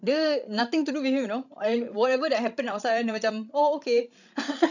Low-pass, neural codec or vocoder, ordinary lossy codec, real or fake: 7.2 kHz; codec, 16 kHz, 16 kbps, FreqCodec, larger model; none; fake